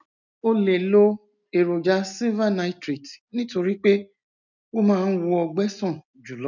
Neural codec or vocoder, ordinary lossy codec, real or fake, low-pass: none; none; real; 7.2 kHz